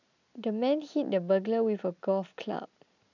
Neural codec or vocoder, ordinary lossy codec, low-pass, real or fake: none; none; 7.2 kHz; real